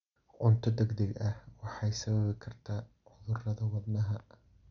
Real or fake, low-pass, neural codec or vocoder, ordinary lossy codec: real; 7.2 kHz; none; none